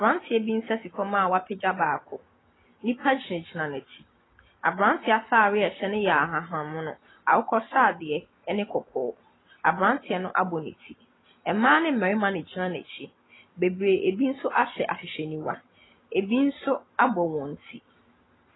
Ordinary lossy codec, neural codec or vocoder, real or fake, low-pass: AAC, 16 kbps; none; real; 7.2 kHz